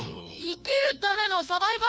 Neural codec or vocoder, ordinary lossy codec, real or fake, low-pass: codec, 16 kHz, 1 kbps, FunCodec, trained on LibriTTS, 50 frames a second; none; fake; none